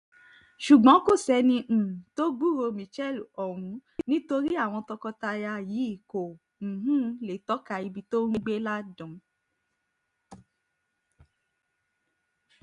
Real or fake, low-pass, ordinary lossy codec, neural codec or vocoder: real; 10.8 kHz; none; none